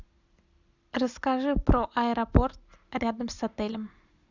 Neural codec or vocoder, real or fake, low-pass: none; real; 7.2 kHz